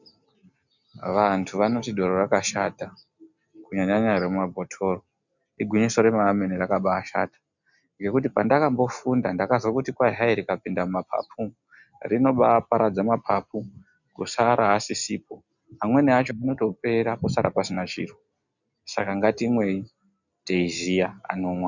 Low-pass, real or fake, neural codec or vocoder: 7.2 kHz; real; none